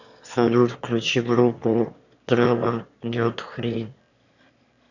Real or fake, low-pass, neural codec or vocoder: fake; 7.2 kHz; autoencoder, 22.05 kHz, a latent of 192 numbers a frame, VITS, trained on one speaker